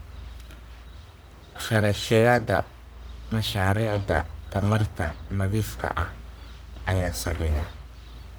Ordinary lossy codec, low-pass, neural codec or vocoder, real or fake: none; none; codec, 44.1 kHz, 1.7 kbps, Pupu-Codec; fake